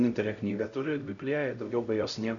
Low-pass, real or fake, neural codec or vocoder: 7.2 kHz; fake; codec, 16 kHz, 0.5 kbps, X-Codec, HuBERT features, trained on LibriSpeech